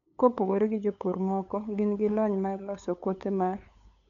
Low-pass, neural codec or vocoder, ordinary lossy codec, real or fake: 7.2 kHz; codec, 16 kHz, 8 kbps, FunCodec, trained on LibriTTS, 25 frames a second; none; fake